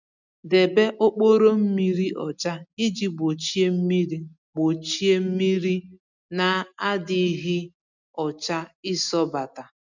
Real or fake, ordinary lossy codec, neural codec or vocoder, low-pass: real; none; none; 7.2 kHz